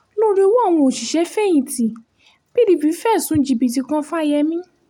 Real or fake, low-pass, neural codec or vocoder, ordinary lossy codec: real; 19.8 kHz; none; none